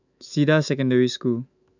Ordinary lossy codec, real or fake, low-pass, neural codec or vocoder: none; fake; 7.2 kHz; autoencoder, 48 kHz, 128 numbers a frame, DAC-VAE, trained on Japanese speech